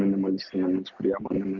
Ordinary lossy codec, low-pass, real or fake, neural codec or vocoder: MP3, 64 kbps; 7.2 kHz; real; none